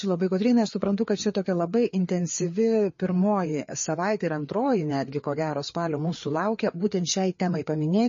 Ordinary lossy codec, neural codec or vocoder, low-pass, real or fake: MP3, 32 kbps; codec, 16 kHz, 4 kbps, FreqCodec, larger model; 7.2 kHz; fake